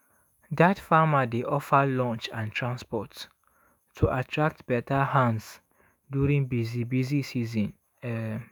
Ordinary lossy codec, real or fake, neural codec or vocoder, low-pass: none; fake; autoencoder, 48 kHz, 128 numbers a frame, DAC-VAE, trained on Japanese speech; none